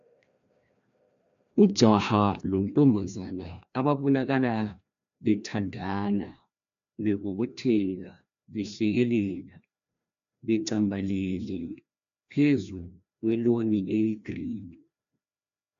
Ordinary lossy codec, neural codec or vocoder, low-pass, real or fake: AAC, 64 kbps; codec, 16 kHz, 1 kbps, FreqCodec, larger model; 7.2 kHz; fake